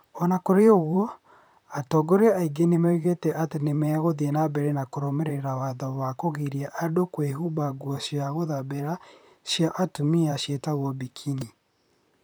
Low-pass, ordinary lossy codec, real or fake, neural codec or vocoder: none; none; fake; vocoder, 44.1 kHz, 128 mel bands, Pupu-Vocoder